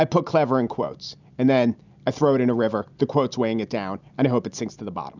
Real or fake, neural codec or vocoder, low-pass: real; none; 7.2 kHz